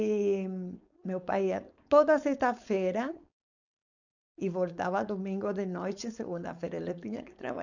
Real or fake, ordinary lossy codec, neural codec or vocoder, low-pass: fake; none; codec, 16 kHz, 4.8 kbps, FACodec; 7.2 kHz